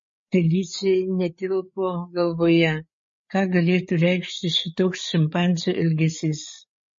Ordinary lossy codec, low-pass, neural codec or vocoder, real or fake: MP3, 32 kbps; 7.2 kHz; codec, 16 kHz, 8 kbps, FreqCodec, larger model; fake